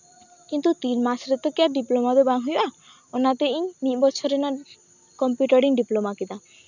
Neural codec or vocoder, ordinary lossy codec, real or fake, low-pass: none; none; real; 7.2 kHz